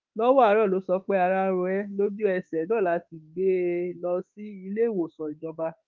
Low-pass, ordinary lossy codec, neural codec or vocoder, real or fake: 7.2 kHz; Opus, 32 kbps; autoencoder, 48 kHz, 32 numbers a frame, DAC-VAE, trained on Japanese speech; fake